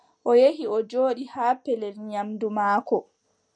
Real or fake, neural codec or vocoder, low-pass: real; none; 9.9 kHz